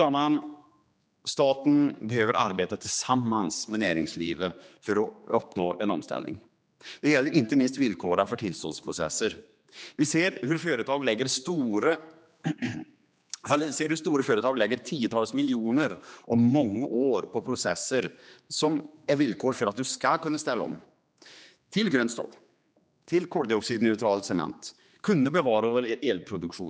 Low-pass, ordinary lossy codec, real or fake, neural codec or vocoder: none; none; fake; codec, 16 kHz, 2 kbps, X-Codec, HuBERT features, trained on general audio